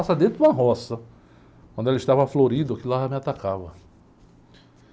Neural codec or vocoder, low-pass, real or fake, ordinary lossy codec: none; none; real; none